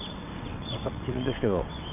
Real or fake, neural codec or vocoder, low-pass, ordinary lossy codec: fake; vocoder, 22.05 kHz, 80 mel bands, WaveNeXt; 3.6 kHz; MP3, 32 kbps